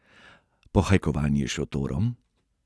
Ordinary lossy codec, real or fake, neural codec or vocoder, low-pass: none; real; none; none